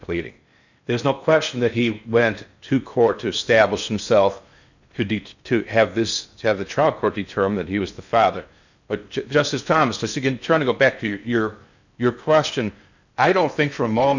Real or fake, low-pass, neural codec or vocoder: fake; 7.2 kHz; codec, 16 kHz in and 24 kHz out, 0.8 kbps, FocalCodec, streaming, 65536 codes